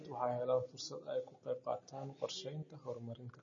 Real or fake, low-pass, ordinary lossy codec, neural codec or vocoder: real; 7.2 kHz; MP3, 32 kbps; none